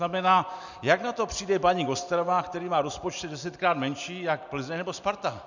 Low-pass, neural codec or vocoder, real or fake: 7.2 kHz; none; real